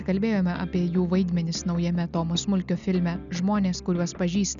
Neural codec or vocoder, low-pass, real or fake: none; 7.2 kHz; real